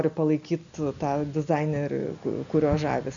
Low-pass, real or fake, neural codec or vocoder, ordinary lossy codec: 7.2 kHz; real; none; MP3, 64 kbps